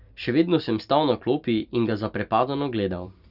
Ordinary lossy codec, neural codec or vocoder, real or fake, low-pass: none; vocoder, 44.1 kHz, 128 mel bands every 256 samples, BigVGAN v2; fake; 5.4 kHz